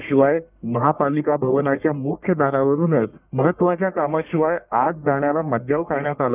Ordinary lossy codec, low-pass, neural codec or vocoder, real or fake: none; 3.6 kHz; codec, 44.1 kHz, 1.7 kbps, Pupu-Codec; fake